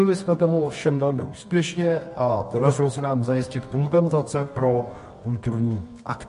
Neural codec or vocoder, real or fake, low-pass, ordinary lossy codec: codec, 24 kHz, 0.9 kbps, WavTokenizer, medium music audio release; fake; 10.8 kHz; MP3, 48 kbps